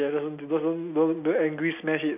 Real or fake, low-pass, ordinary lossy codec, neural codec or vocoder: real; 3.6 kHz; none; none